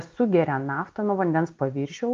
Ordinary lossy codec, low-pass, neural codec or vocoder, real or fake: Opus, 24 kbps; 7.2 kHz; none; real